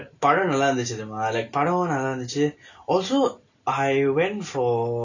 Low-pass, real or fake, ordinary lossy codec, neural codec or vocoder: 7.2 kHz; real; none; none